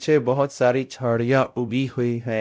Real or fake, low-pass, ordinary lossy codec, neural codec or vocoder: fake; none; none; codec, 16 kHz, 0.5 kbps, X-Codec, WavLM features, trained on Multilingual LibriSpeech